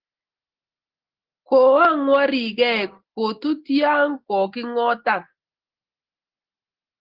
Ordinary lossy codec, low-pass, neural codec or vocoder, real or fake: Opus, 16 kbps; 5.4 kHz; none; real